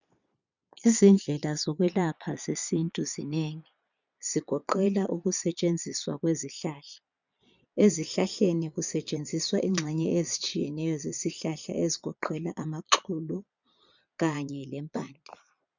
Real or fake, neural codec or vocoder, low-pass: fake; vocoder, 22.05 kHz, 80 mel bands, Vocos; 7.2 kHz